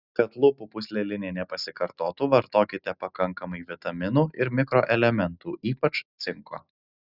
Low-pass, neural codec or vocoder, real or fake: 5.4 kHz; none; real